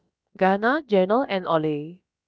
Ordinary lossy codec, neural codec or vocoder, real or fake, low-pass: none; codec, 16 kHz, about 1 kbps, DyCAST, with the encoder's durations; fake; none